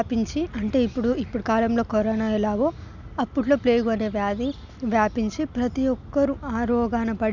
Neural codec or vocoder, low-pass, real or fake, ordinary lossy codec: none; 7.2 kHz; real; none